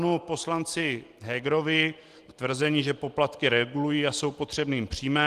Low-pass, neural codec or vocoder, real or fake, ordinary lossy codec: 14.4 kHz; none; real; Opus, 24 kbps